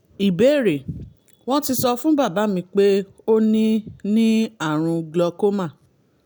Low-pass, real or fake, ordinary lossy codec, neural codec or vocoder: none; real; none; none